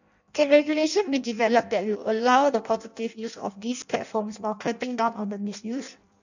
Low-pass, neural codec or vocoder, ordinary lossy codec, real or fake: 7.2 kHz; codec, 16 kHz in and 24 kHz out, 0.6 kbps, FireRedTTS-2 codec; none; fake